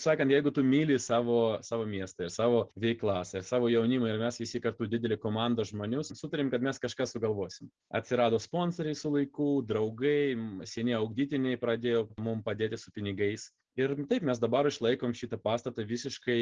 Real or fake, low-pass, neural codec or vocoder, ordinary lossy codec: real; 7.2 kHz; none; Opus, 16 kbps